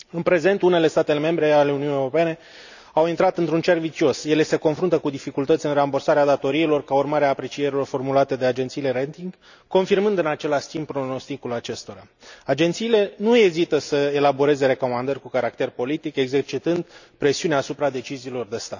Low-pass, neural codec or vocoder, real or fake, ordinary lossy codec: 7.2 kHz; none; real; none